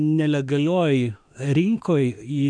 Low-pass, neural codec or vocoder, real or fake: 9.9 kHz; autoencoder, 48 kHz, 32 numbers a frame, DAC-VAE, trained on Japanese speech; fake